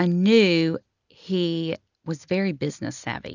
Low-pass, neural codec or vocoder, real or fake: 7.2 kHz; none; real